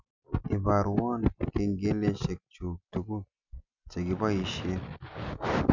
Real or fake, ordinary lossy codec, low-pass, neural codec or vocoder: real; none; 7.2 kHz; none